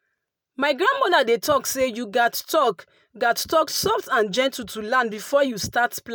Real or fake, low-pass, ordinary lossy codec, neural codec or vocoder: real; none; none; none